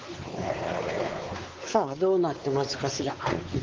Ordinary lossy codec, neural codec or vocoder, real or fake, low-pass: Opus, 16 kbps; codec, 16 kHz, 4 kbps, X-Codec, WavLM features, trained on Multilingual LibriSpeech; fake; 7.2 kHz